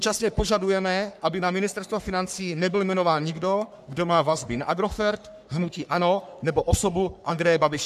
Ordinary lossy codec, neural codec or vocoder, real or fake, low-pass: AAC, 96 kbps; codec, 44.1 kHz, 3.4 kbps, Pupu-Codec; fake; 14.4 kHz